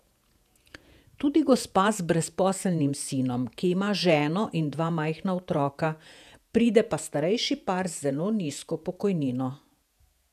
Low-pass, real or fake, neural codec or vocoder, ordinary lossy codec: 14.4 kHz; fake; vocoder, 48 kHz, 128 mel bands, Vocos; none